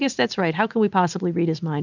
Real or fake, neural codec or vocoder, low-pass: real; none; 7.2 kHz